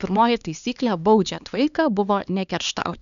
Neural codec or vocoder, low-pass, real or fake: codec, 16 kHz, 2 kbps, X-Codec, HuBERT features, trained on LibriSpeech; 7.2 kHz; fake